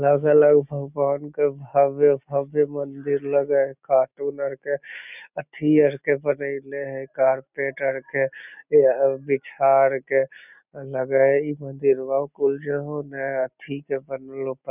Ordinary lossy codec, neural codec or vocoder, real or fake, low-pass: none; none; real; 3.6 kHz